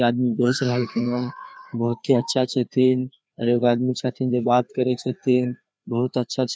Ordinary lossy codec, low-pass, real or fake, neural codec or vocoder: none; none; fake; codec, 16 kHz, 2 kbps, FreqCodec, larger model